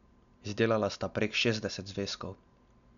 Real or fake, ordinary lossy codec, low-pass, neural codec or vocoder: real; none; 7.2 kHz; none